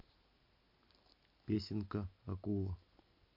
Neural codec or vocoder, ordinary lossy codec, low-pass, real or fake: none; MP3, 32 kbps; 5.4 kHz; real